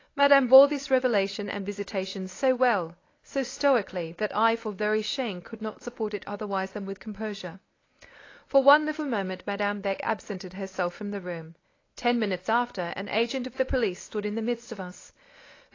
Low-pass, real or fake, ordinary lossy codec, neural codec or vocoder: 7.2 kHz; fake; AAC, 32 kbps; codec, 24 kHz, 0.9 kbps, WavTokenizer, medium speech release version 1